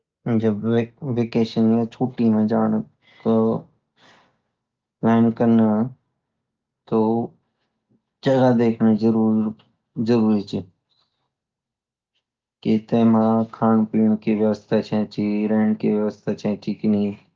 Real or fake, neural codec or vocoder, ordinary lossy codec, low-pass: real; none; Opus, 24 kbps; 7.2 kHz